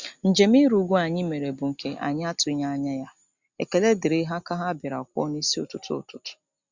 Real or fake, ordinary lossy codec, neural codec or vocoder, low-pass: real; Opus, 64 kbps; none; 7.2 kHz